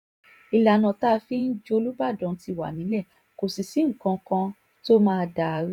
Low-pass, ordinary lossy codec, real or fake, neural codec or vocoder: 19.8 kHz; none; fake; vocoder, 44.1 kHz, 128 mel bands every 512 samples, BigVGAN v2